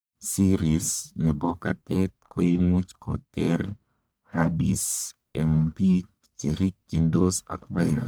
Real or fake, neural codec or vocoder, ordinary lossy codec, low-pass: fake; codec, 44.1 kHz, 1.7 kbps, Pupu-Codec; none; none